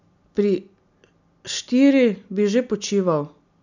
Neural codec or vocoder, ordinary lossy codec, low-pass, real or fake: none; none; 7.2 kHz; real